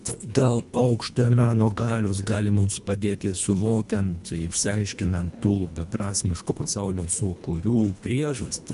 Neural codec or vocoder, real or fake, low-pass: codec, 24 kHz, 1.5 kbps, HILCodec; fake; 10.8 kHz